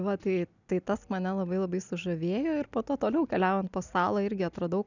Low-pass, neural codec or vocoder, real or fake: 7.2 kHz; none; real